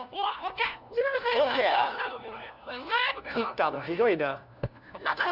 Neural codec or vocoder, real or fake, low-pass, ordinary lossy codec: codec, 16 kHz, 1 kbps, FunCodec, trained on LibriTTS, 50 frames a second; fake; 5.4 kHz; none